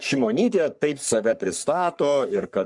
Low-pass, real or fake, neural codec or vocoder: 10.8 kHz; fake; codec, 44.1 kHz, 3.4 kbps, Pupu-Codec